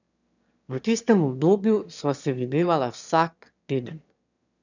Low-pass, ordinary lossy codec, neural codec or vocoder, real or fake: 7.2 kHz; none; autoencoder, 22.05 kHz, a latent of 192 numbers a frame, VITS, trained on one speaker; fake